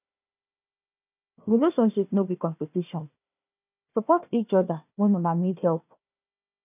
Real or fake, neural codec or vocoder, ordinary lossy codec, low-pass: fake; codec, 16 kHz, 1 kbps, FunCodec, trained on Chinese and English, 50 frames a second; none; 3.6 kHz